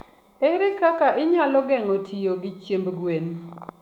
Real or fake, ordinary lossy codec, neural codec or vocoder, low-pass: fake; none; autoencoder, 48 kHz, 128 numbers a frame, DAC-VAE, trained on Japanese speech; 19.8 kHz